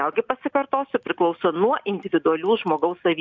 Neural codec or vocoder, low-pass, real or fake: none; 7.2 kHz; real